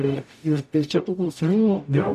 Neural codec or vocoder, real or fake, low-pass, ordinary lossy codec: codec, 44.1 kHz, 0.9 kbps, DAC; fake; 14.4 kHz; MP3, 96 kbps